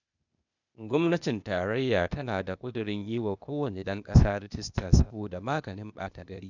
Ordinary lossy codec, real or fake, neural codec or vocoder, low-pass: none; fake; codec, 16 kHz, 0.8 kbps, ZipCodec; 7.2 kHz